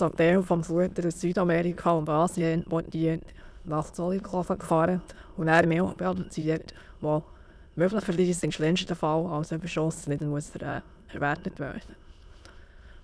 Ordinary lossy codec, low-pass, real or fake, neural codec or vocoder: none; none; fake; autoencoder, 22.05 kHz, a latent of 192 numbers a frame, VITS, trained on many speakers